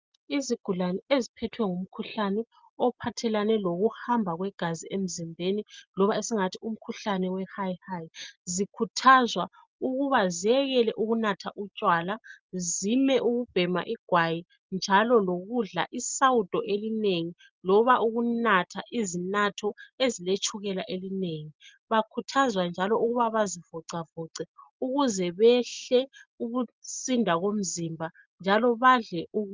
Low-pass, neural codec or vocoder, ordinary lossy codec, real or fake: 7.2 kHz; none; Opus, 24 kbps; real